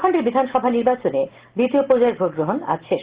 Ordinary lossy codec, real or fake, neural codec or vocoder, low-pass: Opus, 16 kbps; real; none; 3.6 kHz